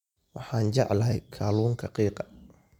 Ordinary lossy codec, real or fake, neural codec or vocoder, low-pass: none; real; none; 19.8 kHz